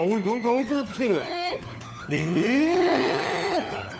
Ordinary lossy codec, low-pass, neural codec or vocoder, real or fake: none; none; codec, 16 kHz, 4 kbps, FunCodec, trained on LibriTTS, 50 frames a second; fake